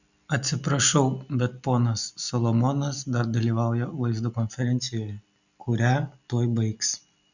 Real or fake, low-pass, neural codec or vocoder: real; 7.2 kHz; none